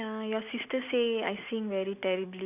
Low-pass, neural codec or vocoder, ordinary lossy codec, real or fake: 3.6 kHz; none; none; real